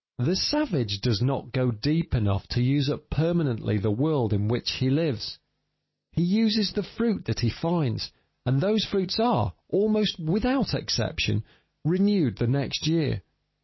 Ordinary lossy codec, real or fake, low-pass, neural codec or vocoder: MP3, 24 kbps; real; 7.2 kHz; none